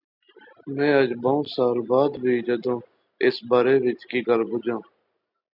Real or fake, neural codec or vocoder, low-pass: real; none; 5.4 kHz